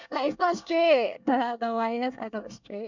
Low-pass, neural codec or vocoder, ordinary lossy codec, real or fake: 7.2 kHz; codec, 24 kHz, 1 kbps, SNAC; none; fake